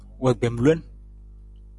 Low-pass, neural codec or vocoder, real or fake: 10.8 kHz; none; real